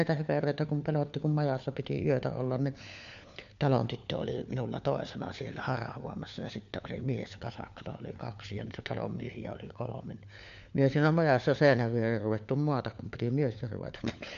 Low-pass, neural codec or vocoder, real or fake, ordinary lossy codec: 7.2 kHz; codec, 16 kHz, 4 kbps, FunCodec, trained on LibriTTS, 50 frames a second; fake; MP3, 64 kbps